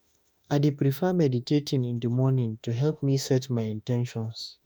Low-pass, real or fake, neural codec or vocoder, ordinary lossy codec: none; fake; autoencoder, 48 kHz, 32 numbers a frame, DAC-VAE, trained on Japanese speech; none